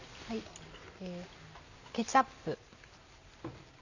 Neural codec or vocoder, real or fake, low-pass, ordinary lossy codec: none; real; 7.2 kHz; none